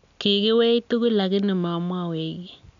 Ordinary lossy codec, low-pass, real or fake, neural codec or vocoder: none; 7.2 kHz; real; none